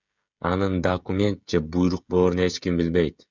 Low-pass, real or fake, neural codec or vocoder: 7.2 kHz; fake; codec, 16 kHz, 16 kbps, FreqCodec, smaller model